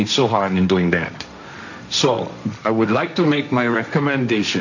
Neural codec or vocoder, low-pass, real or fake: codec, 16 kHz, 1.1 kbps, Voila-Tokenizer; 7.2 kHz; fake